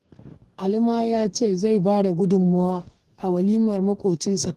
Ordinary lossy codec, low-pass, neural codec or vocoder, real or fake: Opus, 24 kbps; 14.4 kHz; codec, 44.1 kHz, 2.6 kbps, DAC; fake